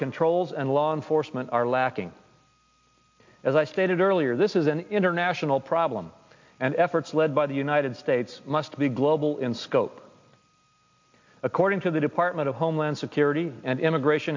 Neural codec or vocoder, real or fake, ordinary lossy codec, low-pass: none; real; MP3, 48 kbps; 7.2 kHz